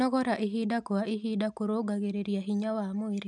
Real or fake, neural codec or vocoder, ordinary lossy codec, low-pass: real; none; none; 10.8 kHz